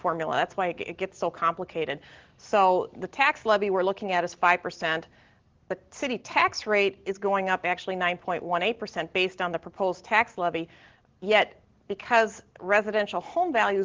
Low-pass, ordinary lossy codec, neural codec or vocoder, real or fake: 7.2 kHz; Opus, 16 kbps; none; real